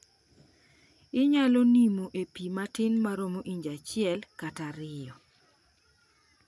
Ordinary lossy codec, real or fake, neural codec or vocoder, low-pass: none; real; none; none